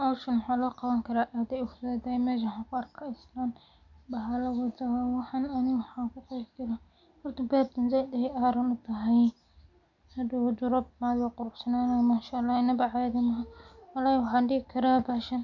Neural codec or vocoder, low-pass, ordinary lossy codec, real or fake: none; 7.2 kHz; none; real